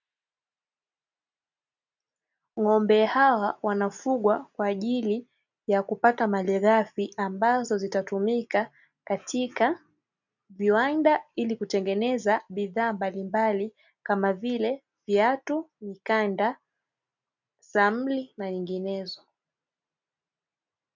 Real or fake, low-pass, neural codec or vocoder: real; 7.2 kHz; none